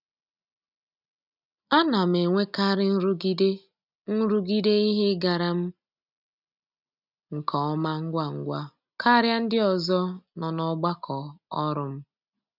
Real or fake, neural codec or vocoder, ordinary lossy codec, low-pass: real; none; none; 5.4 kHz